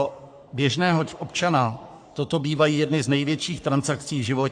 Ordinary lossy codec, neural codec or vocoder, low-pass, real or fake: AAC, 64 kbps; codec, 44.1 kHz, 3.4 kbps, Pupu-Codec; 9.9 kHz; fake